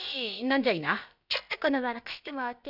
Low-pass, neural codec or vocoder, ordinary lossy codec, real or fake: 5.4 kHz; codec, 16 kHz, about 1 kbps, DyCAST, with the encoder's durations; none; fake